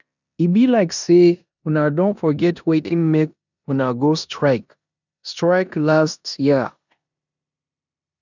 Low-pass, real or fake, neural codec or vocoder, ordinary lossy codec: 7.2 kHz; fake; codec, 16 kHz in and 24 kHz out, 0.9 kbps, LongCat-Audio-Codec, four codebook decoder; none